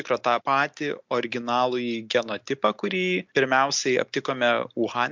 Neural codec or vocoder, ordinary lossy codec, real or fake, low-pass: none; MP3, 64 kbps; real; 7.2 kHz